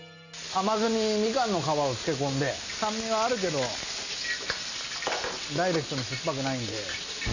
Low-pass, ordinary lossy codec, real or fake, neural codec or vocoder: 7.2 kHz; none; real; none